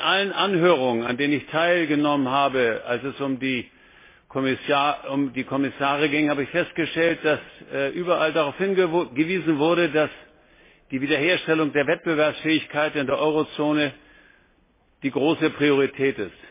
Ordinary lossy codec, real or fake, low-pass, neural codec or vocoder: MP3, 16 kbps; real; 3.6 kHz; none